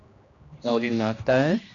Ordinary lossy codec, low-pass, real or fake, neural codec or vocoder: AAC, 48 kbps; 7.2 kHz; fake; codec, 16 kHz, 1 kbps, X-Codec, HuBERT features, trained on general audio